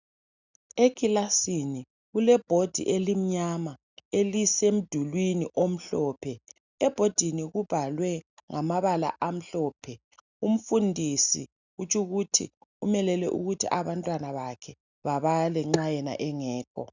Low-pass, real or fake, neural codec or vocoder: 7.2 kHz; real; none